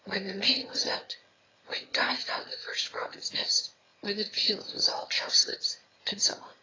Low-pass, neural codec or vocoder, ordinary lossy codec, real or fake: 7.2 kHz; autoencoder, 22.05 kHz, a latent of 192 numbers a frame, VITS, trained on one speaker; AAC, 32 kbps; fake